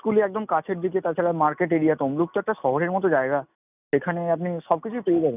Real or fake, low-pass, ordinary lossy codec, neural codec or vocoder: real; 3.6 kHz; none; none